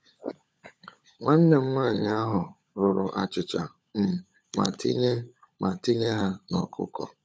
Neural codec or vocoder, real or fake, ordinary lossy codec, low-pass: codec, 16 kHz, 16 kbps, FunCodec, trained on LibriTTS, 50 frames a second; fake; none; none